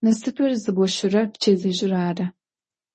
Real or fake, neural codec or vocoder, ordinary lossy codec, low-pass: fake; codec, 24 kHz, 0.9 kbps, WavTokenizer, medium speech release version 1; MP3, 32 kbps; 10.8 kHz